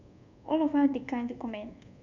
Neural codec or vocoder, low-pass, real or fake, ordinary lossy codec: codec, 24 kHz, 1.2 kbps, DualCodec; 7.2 kHz; fake; none